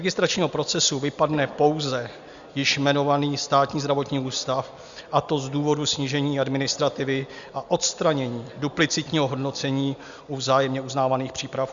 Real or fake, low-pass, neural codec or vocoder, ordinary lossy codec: real; 7.2 kHz; none; Opus, 64 kbps